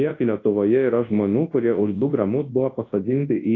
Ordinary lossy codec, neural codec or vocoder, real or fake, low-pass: AAC, 32 kbps; codec, 24 kHz, 0.9 kbps, WavTokenizer, large speech release; fake; 7.2 kHz